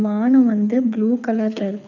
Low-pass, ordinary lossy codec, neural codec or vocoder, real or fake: 7.2 kHz; none; codec, 24 kHz, 6 kbps, HILCodec; fake